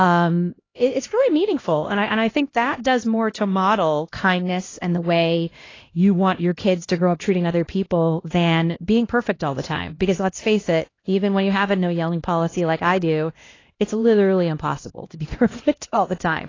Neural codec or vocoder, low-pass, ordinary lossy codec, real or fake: codec, 16 kHz, 1 kbps, X-Codec, HuBERT features, trained on LibriSpeech; 7.2 kHz; AAC, 32 kbps; fake